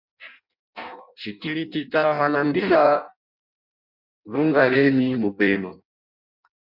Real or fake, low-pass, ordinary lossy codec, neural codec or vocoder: fake; 5.4 kHz; MP3, 48 kbps; codec, 16 kHz in and 24 kHz out, 0.6 kbps, FireRedTTS-2 codec